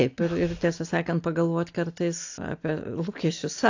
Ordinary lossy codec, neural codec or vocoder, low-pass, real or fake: AAC, 48 kbps; none; 7.2 kHz; real